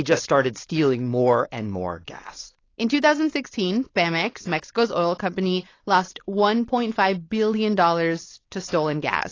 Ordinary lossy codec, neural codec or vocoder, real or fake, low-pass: AAC, 32 kbps; codec, 16 kHz, 4.8 kbps, FACodec; fake; 7.2 kHz